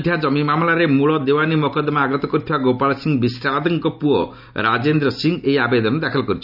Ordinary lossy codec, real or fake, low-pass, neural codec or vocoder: none; real; 5.4 kHz; none